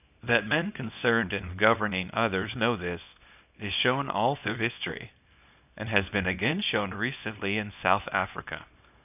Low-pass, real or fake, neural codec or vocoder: 3.6 kHz; fake; codec, 24 kHz, 0.9 kbps, WavTokenizer, small release